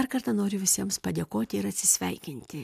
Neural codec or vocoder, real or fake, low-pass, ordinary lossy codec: none; real; 14.4 kHz; AAC, 96 kbps